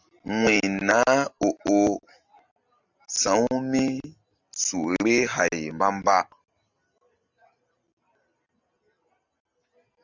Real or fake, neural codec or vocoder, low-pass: real; none; 7.2 kHz